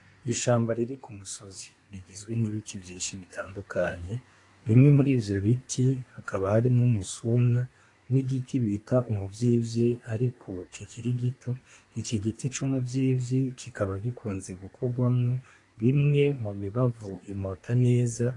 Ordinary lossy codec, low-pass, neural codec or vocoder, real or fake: AAC, 48 kbps; 10.8 kHz; codec, 24 kHz, 1 kbps, SNAC; fake